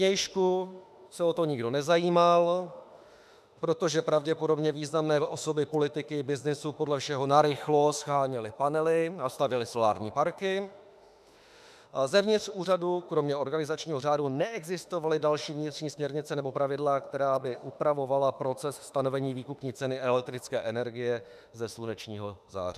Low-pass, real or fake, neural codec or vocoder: 14.4 kHz; fake; autoencoder, 48 kHz, 32 numbers a frame, DAC-VAE, trained on Japanese speech